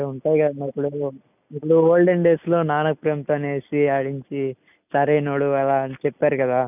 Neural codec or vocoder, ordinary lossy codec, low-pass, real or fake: none; none; 3.6 kHz; real